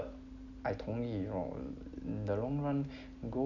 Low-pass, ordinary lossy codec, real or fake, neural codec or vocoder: 7.2 kHz; none; real; none